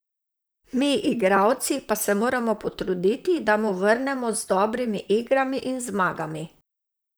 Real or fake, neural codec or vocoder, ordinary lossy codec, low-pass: fake; vocoder, 44.1 kHz, 128 mel bands, Pupu-Vocoder; none; none